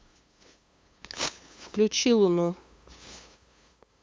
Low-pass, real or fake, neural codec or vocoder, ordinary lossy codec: none; fake; codec, 16 kHz, 2 kbps, FunCodec, trained on LibriTTS, 25 frames a second; none